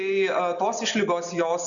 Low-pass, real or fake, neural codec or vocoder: 7.2 kHz; real; none